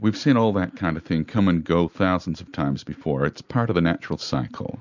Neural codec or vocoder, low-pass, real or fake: none; 7.2 kHz; real